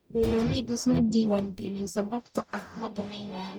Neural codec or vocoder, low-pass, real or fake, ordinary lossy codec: codec, 44.1 kHz, 0.9 kbps, DAC; none; fake; none